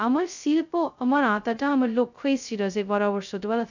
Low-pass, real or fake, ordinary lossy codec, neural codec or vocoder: 7.2 kHz; fake; none; codec, 16 kHz, 0.2 kbps, FocalCodec